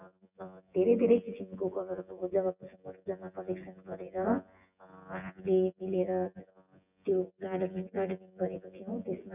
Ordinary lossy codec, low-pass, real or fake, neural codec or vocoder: none; 3.6 kHz; fake; vocoder, 24 kHz, 100 mel bands, Vocos